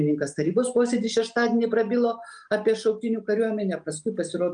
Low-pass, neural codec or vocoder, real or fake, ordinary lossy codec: 9.9 kHz; none; real; Opus, 32 kbps